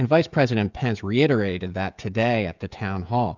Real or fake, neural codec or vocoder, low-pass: fake; codec, 16 kHz, 16 kbps, FreqCodec, smaller model; 7.2 kHz